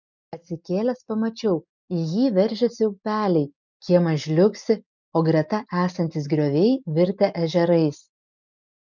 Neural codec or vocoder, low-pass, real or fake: none; 7.2 kHz; real